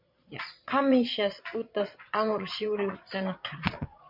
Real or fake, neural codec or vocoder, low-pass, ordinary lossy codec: fake; vocoder, 22.05 kHz, 80 mel bands, Vocos; 5.4 kHz; MP3, 48 kbps